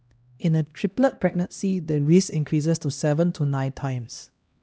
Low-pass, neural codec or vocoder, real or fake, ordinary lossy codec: none; codec, 16 kHz, 1 kbps, X-Codec, HuBERT features, trained on LibriSpeech; fake; none